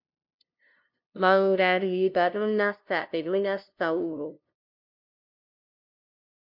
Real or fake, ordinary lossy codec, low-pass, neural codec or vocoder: fake; MP3, 48 kbps; 5.4 kHz; codec, 16 kHz, 0.5 kbps, FunCodec, trained on LibriTTS, 25 frames a second